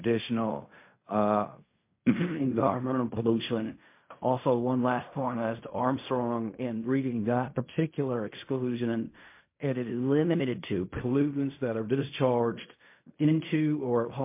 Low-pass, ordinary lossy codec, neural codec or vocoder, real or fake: 3.6 kHz; MP3, 24 kbps; codec, 16 kHz in and 24 kHz out, 0.4 kbps, LongCat-Audio-Codec, fine tuned four codebook decoder; fake